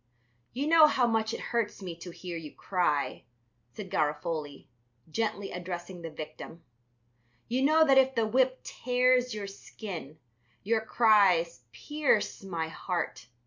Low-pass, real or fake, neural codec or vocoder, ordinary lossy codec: 7.2 kHz; real; none; MP3, 64 kbps